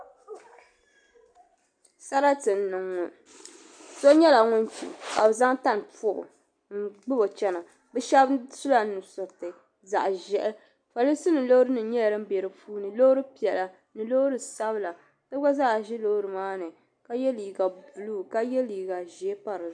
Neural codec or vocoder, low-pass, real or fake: none; 9.9 kHz; real